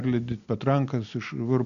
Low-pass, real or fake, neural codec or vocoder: 7.2 kHz; real; none